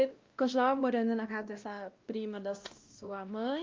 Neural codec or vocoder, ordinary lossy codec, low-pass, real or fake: codec, 16 kHz, 1 kbps, X-Codec, WavLM features, trained on Multilingual LibriSpeech; Opus, 32 kbps; 7.2 kHz; fake